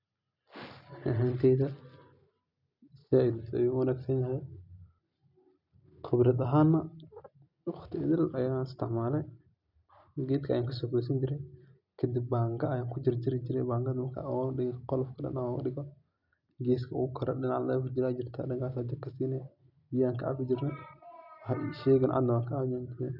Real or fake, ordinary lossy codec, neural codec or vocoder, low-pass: real; none; none; 5.4 kHz